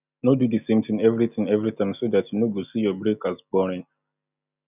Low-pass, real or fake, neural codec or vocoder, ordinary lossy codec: 3.6 kHz; real; none; none